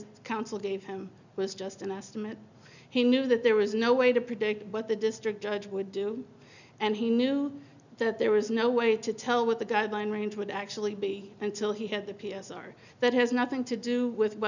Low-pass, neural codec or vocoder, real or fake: 7.2 kHz; none; real